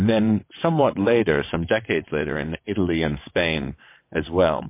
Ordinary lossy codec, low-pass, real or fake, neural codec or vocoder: MP3, 24 kbps; 3.6 kHz; fake; vocoder, 22.05 kHz, 80 mel bands, WaveNeXt